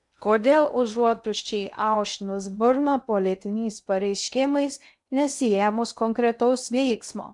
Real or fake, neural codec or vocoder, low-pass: fake; codec, 16 kHz in and 24 kHz out, 0.6 kbps, FocalCodec, streaming, 2048 codes; 10.8 kHz